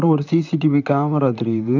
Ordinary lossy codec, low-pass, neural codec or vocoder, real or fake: none; 7.2 kHz; vocoder, 44.1 kHz, 128 mel bands, Pupu-Vocoder; fake